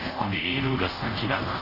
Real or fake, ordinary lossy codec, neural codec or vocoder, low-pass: fake; none; codec, 24 kHz, 0.5 kbps, DualCodec; 5.4 kHz